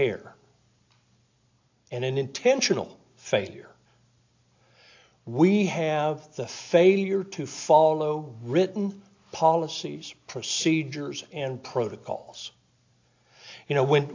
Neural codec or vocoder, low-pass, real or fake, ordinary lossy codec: none; 7.2 kHz; real; AAC, 48 kbps